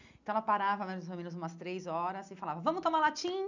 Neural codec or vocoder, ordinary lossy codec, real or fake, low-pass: none; none; real; 7.2 kHz